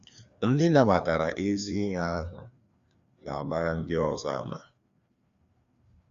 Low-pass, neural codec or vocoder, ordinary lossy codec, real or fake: 7.2 kHz; codec, 16 kHz, 2 kbps, FreqCodec, larger model; Opus, 64 kbps; fake